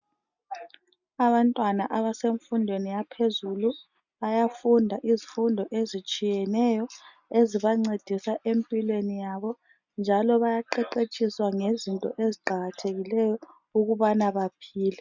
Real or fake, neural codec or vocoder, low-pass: real; none; 7.2 kHz